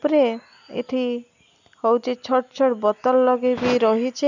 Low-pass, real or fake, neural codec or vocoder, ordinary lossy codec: 7.2 kHz; real; none; none